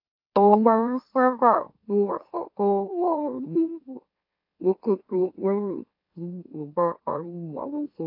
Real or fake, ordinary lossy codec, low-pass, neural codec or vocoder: fake; AAC, 48 kbps; 5.4 kHz; autoencoder, 44.1 kHz, a latent of 192 numbers a frame, MeloTTS